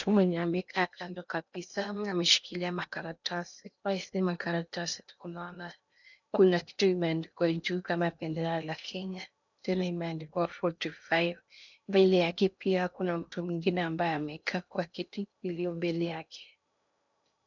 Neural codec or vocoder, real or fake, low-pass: codec, 16 kHz in and 24 kHz out, 0.8 kbps, FocalCodec, streaming, 65536 codes; fake; 7.2 kHz